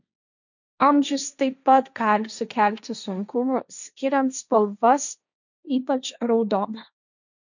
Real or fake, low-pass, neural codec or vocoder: fake; 7.2 kHz; codec, 16 kHz, 1.1 kbps, Voila-Tokenizer